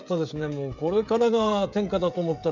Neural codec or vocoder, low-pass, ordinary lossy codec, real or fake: codec, 16 kHz, 16 kbps, FreqCodec, smaller model; 7.2 kHz; none; fake